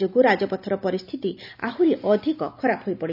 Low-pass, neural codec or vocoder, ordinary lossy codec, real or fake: 5.4 kHz; none; none; real